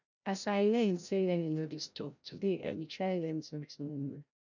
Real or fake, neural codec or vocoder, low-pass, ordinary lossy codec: fake; codec, 16 kHz, 0.5 kbps, FreqCodec, larger model; 7.2 kHz; none